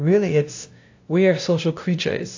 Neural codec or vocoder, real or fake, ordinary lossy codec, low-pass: codec, 16 kHz, 0.5 kbps, FunCodec, trained on LibriTTS, 25 frames a second; fake; AAC, 48 kbps; 7.2 kHz